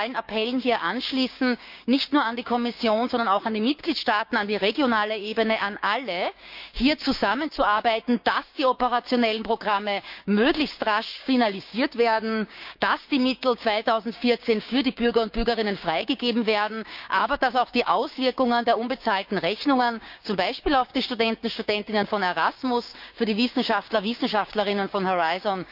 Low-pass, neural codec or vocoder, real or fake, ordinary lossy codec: 5.4 kHz; codec, 16 kHz, 6 kbps, DAC; fake; none